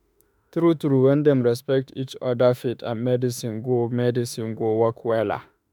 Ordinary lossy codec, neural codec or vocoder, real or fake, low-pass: none; autoencoder, 48 kHz, 32 numbers a frame, DAC-VAE, trained on Japanese speech; fake; none